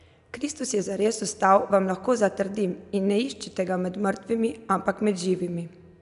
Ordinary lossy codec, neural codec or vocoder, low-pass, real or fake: none; none; 10.8 kHz; real